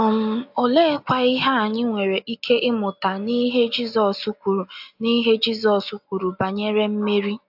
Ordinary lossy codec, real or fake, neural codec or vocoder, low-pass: AAC, 48 kbps; real; none; 5.4 kHz